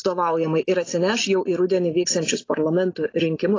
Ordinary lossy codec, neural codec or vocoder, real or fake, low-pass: AAC, 32 kbps; none; real; 7.2 kHz